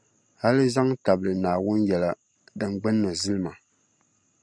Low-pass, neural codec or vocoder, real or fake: 9.9 kHz; none; real